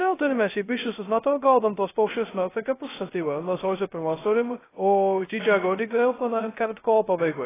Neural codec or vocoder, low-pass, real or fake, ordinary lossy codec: codec, 16 kHz, 0.2 kbps, FocalCodec; 3.6 kHz; fake; AAC, 16 kbps